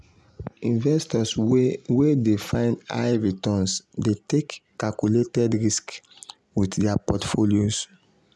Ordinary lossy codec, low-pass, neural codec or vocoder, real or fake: none; none; none; real